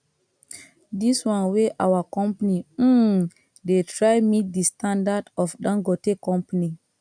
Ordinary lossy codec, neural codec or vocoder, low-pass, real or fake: none; none; 9.9 kHz; real